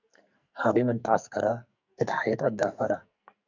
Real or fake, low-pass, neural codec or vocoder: fake; 7.2 kHz; codec, 44.1 kHz, 2.6 kbps, SNAC